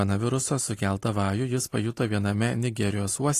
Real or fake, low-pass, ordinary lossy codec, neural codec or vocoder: real; 14.4 kHz; AAC, 48 kbps; none